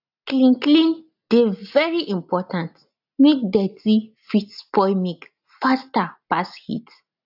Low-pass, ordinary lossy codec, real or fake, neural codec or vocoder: 5.4 kHz; AAC, 48 kbps; real; none